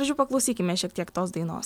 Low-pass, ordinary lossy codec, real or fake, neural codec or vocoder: 19.8 kHz; MP3, 96 kbps; real; none